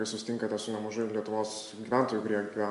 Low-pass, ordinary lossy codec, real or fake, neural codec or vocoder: 10.8 kHz; MP3, 64 kbps; real; none